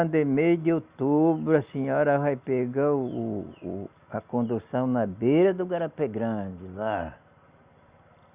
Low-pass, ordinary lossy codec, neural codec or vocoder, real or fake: 3.6 kHz; Opus, 64 kbps; none; real